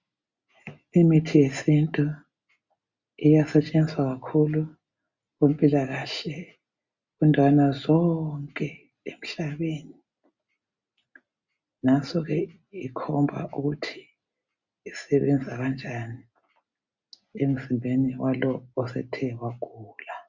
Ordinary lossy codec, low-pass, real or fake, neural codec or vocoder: Opus, 64 kbps; 7.2 kHz; real; none